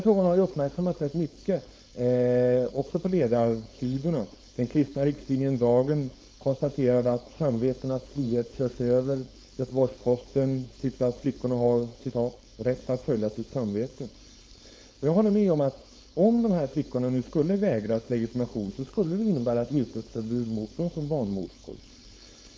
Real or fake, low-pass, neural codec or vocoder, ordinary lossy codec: fake; none; codec, 16 kHz, 4.8 kbps, FACodec; none